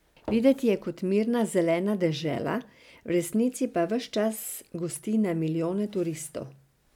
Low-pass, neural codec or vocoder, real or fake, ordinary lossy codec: 19.8 kHz; none; real; none